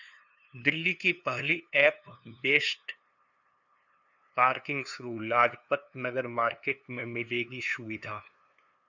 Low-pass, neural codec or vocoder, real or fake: 7.2 kHz; codec, 16 kHz, 2 kbps, FunCodec, trained on LibriTTS, 25 frames a second; fake